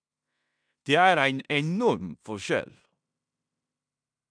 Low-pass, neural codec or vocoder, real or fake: 9.9 kHz; codec, 16 kHz in and 24 kHz out, 0.9 kbps, LongCat-Audio-Codec, fine tuned four codebook decoder; fake